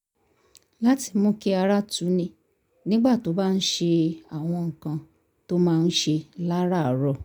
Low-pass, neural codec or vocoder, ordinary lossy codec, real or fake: none; none; none; real